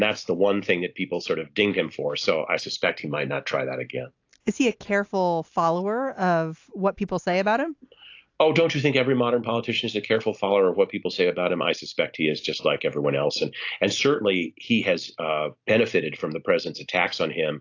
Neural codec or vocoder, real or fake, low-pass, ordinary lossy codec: none; real; 7.2 kHz; AAC, 48 kbps